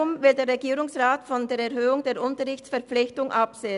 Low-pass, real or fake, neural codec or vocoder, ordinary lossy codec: 10.8 kHz; real; none; none